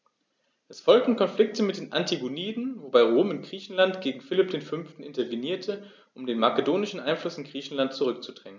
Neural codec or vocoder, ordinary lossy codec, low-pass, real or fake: none; none; none; real